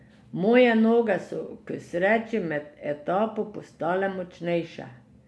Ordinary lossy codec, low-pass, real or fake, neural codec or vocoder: none; none; real; none